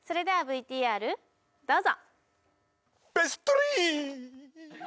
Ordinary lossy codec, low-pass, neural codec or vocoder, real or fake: none; none; none; real